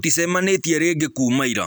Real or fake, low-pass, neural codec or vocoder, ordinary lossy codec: real; none; none; none